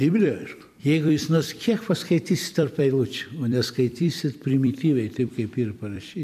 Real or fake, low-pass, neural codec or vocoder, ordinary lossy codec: fake; 14.4 kHz; vocoder, 44.1 kHz, 128 mel bands every 256 samples, BigVGAN v2; MP3, 96 kbps